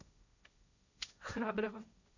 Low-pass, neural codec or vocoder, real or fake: 7.2 kHz; codec, 16 kHz, 1.1 kbps, Voila-Tokenizer; fake